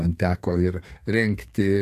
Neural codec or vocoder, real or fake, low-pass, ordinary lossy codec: codec, 32 kHz, 1.9 kbps, SNAC; fake; 14.4 kHz; AAC, 64 kbps